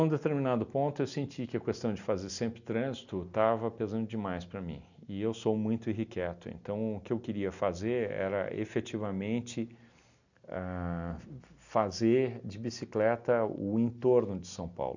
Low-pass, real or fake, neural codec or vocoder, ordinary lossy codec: 7.2 kHz; real; none; none